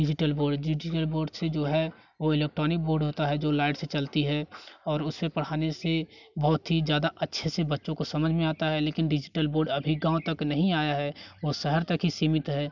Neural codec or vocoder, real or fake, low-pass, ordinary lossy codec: none; real; 7.2 kHz; none